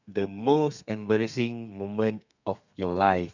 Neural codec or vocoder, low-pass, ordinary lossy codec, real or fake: codec, 32 kHz, 1.9 kbps, SNAC; 7.2 kHz; none; fake